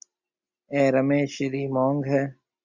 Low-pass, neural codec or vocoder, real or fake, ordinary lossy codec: 7.2 kHz; none; real; Opus, 64 kbps